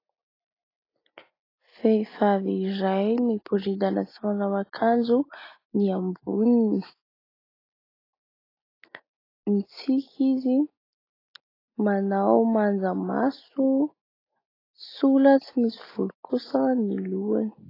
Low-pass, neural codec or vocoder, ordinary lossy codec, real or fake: 5.4 kHz; none; AAC, 24 kbps; real